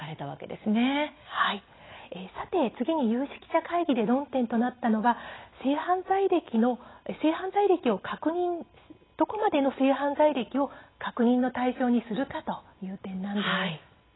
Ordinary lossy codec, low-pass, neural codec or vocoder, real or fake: AAC, 16 kbps; 7.2 kHz; none; real